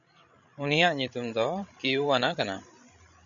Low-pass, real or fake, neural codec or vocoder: 7.2 kHz; fake; codec, 16 kHz, 16 kbps, FreqCodec, larger model